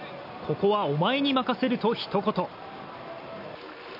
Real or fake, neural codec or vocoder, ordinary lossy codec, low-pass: real; none; none; 5.4 kHz